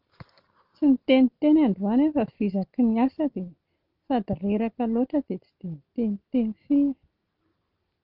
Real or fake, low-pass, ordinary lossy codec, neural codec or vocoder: real; 5.4 kHz; Opus, 32 kbps; none